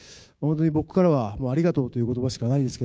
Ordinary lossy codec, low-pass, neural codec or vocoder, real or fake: none; none; codec, 16 kHz, 6 kbps, DAC; fake